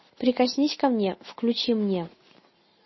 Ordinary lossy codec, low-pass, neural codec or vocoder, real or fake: MP3, 24 kbps; 7.2 kHz; none; real